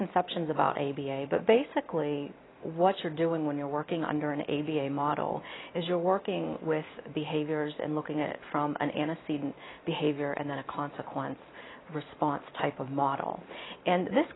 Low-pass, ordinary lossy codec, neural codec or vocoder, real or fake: 7.2 kHz; AAC, 16 kbps; none; real